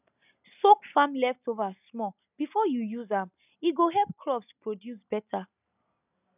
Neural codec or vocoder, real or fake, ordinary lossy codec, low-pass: none; real; none; 3.6 kHz